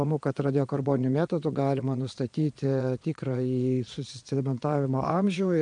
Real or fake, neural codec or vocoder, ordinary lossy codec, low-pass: fake; vocoder, 22.05 kHz, 80 mel bands, Vocos; MP3, 64 kbps; 9.9 kHz